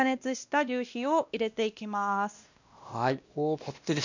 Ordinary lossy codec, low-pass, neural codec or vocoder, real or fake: none; 7.2 kHz; codec, 16 kHz, 1 kbps, X-Codec, WavLM features, trained on Multilingual LibriSpeech; fake